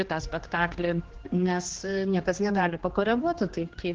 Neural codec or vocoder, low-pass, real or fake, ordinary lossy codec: codec, 16 kHz, 2 kbps, X-Codec, HuBERT features, trained on general audio; 7.2 kHz; fake; Opus, 16 kbps